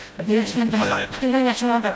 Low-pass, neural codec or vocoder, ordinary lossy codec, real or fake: none; codec, 16 kHz, 0.5 kbps, FreqCodec, smaller model; none; fake